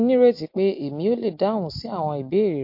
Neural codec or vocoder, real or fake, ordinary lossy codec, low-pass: none; real; MP3, 32 kbps; 5.4 kHz